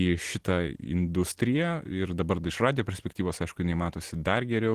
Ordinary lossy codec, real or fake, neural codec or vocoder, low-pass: Opus, 16 kbps; real; none; 14.4 kHz